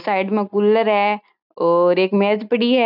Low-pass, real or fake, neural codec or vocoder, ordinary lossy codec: 5.4 kHz; real; none; none